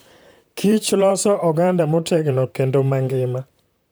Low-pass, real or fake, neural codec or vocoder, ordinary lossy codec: none; fake; vocoder, 44.1 kHz, 128 mel bands, Pupu-Vocoder; none